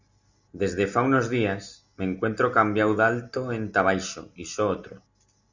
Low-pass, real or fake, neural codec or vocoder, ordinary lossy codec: 7.2 kHz; real; none; Opus, 64 kbps